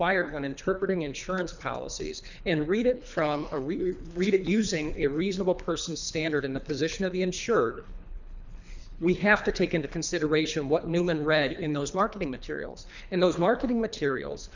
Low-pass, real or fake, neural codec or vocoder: 7.2 kHz; fake; codec, 24 kHz, 3 kbps, HILCodec